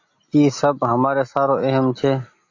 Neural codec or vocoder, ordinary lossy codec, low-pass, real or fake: none; AAC, 48 kbps; 7.2 kHz; real